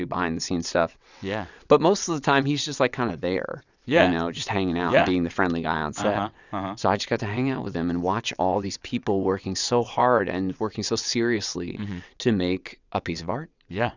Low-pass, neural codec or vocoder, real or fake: 7.2 kHz; vocoder, 22.05 kHz, 80 mel bands, WaveNeXt; fake